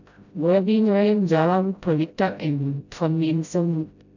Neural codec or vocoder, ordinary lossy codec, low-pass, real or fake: codec, 16 kHz, 0.5 kbps, FreqCodec, smaller model; none; 7.2 kHz; fake